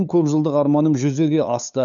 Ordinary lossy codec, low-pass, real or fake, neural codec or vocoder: none; 7.2 kHz; fake; codec, 16 kHz, 8 kbps, FunCodec, trained on LibriTTS, 25 frames a second